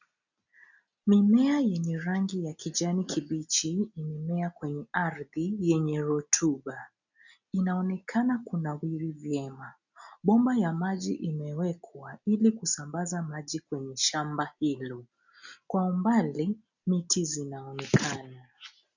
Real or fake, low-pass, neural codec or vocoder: real; 7.2 kHz; none